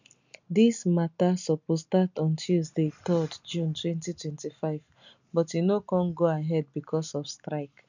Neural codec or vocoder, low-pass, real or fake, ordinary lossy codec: none; 7.2 kHz; real; none